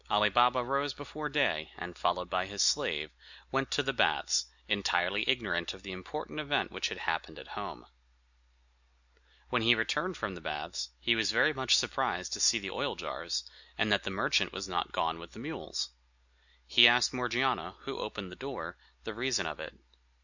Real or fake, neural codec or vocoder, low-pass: real; none; 7.2 kHz